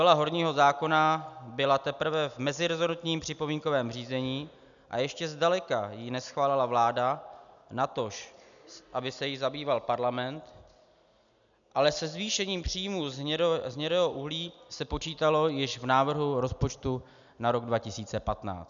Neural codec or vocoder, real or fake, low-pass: none; real; 7.2 kHz